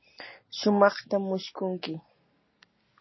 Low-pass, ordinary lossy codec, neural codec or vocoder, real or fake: 7.2 kHz; MP3, 24 kbps; none; real